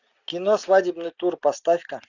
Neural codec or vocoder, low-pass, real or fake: none; 7.2 kHz; real